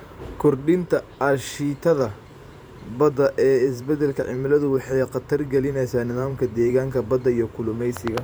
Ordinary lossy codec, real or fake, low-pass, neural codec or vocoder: none; fake; none; vocoder, 44.1 kHz, 128 mel bands every 512 samples, BigVGAN v2